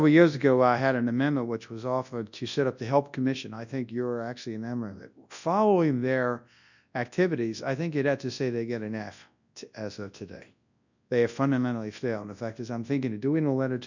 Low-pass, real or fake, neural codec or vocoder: 7.2 kHz; fake; codec, 24 kHz, 0.9 kbps, WavTokenizer, large speech release